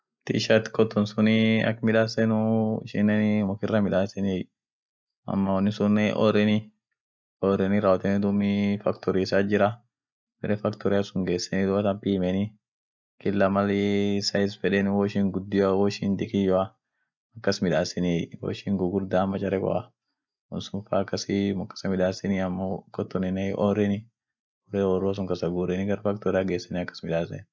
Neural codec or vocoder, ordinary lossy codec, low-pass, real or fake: none; none; none; real